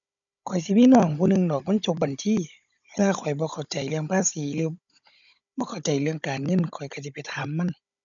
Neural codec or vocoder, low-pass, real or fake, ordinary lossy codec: codec, 16 kHz, 16 kbps, FunCodec, trained on Chinese and English, 50 frames a second; 7.2 kHz; fake; none